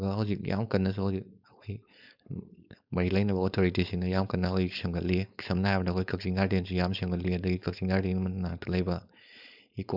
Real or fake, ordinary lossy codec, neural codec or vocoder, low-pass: fake; none; codec, 16 kHz, 4.8 kbps, FACodec; 5.4 kHz